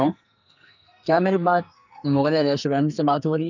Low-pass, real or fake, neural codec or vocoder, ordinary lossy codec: 7.2 kHz; fake; codec, 44.1 kHz, 2.6 kbps, SNAC; none